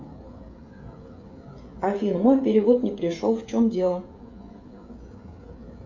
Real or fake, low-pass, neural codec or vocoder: fake; 7.2 kHz; codec, 16 kHz, 16 kbps, FreqCodec, smaller model